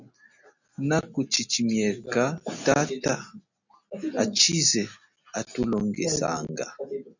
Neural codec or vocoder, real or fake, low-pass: none; real; 7.2 kHz